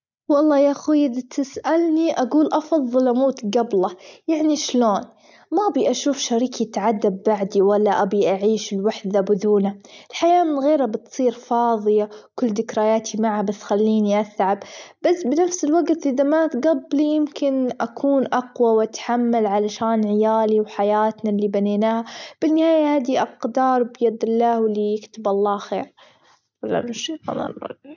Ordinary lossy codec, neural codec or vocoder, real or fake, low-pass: none; none; real; 7.2 kHz